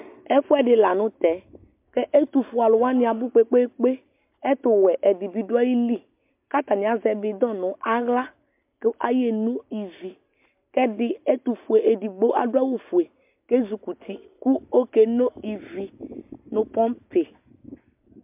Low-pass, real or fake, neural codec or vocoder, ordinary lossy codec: 3.6 kHz; real; none; MP3, 32 kbps